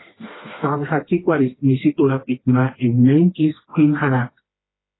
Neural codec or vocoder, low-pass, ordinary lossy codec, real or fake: codec, 16 kHz, 2 kbps, FreqCodec, smaller model; 7.2 kHz; AAC, 16 kbps; fake